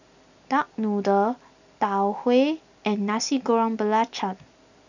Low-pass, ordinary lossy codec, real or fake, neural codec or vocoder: 7.2 kHz; none; real; none